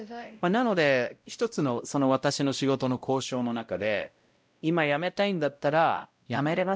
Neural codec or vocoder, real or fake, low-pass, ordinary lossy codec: codec, 16 kHz, 0.5 kbps, X-Codec, WavLM features, trained on Multilingual LibriSpeech; fake; none; none